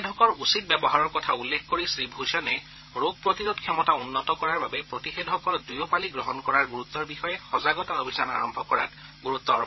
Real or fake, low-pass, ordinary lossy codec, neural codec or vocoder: fake; 7.2 kHz; MP3, 24 kbps; codec, 16 kHz, 16 kbps, FreqCodec, larger model